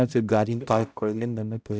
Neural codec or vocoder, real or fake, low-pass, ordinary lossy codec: codec, 16 kHz, 0.5 kbps, X-Codec, HuBERT features, trained on balanced general audio; fake; none; none